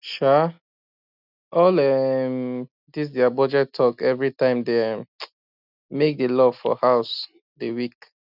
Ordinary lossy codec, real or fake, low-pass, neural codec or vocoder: none; real; 5.4 kHz; none